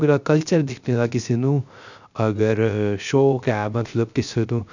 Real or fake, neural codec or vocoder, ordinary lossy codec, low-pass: fake; codec, 16 kHz, 0.3 kbps, FocalCodec; none; 7.2 kHz